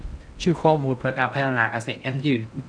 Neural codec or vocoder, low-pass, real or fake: codec, 16 kHz in and 24 kHz out, 0.6 kbps, FocalCodec, streaming, 2048 codes; 9.9 kHz; fake